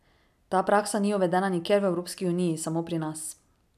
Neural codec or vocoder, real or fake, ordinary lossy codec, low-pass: none; real; none; 14.4 kHz